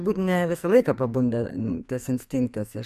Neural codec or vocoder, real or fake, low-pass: codec, 44.1 kHz, 2.6 kbps, SNAC; fake; 14.4 kHz